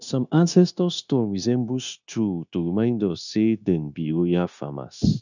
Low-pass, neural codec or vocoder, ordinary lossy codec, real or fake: 7.2 kHz; codec, 16 kHz, 0.9 kbps, LongCat-Audio-Codec; none; fake